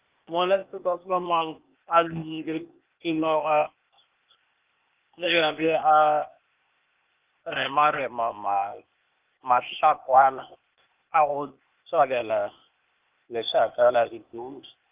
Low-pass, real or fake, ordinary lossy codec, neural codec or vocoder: 3.6 kHz; fake; Opus, 24 kbps; codec, 16 kHz, 0.8 kbps, ZipCodec